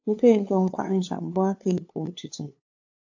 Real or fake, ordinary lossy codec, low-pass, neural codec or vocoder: fake; AAC, 48 kbps; 7.2 kHz; codec, 16 kHz, 2 kbps, FunCodec, trained on LibriTTS, 25 frames a second